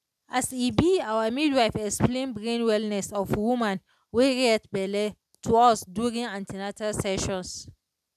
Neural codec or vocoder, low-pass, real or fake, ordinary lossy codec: none; 14.4 kHz; real; none